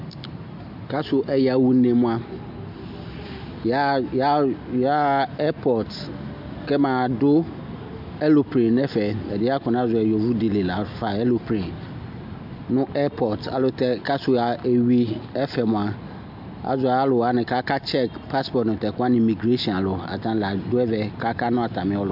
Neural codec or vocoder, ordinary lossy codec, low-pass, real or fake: none; MP3, 48 kbps; 5.4 kHz; real